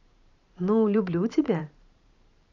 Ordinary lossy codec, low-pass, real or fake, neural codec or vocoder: none; 7.2 kHz; fake; vocoder, 44.1 kHz, 128 mel bands every 512 samples, BigVGAN v2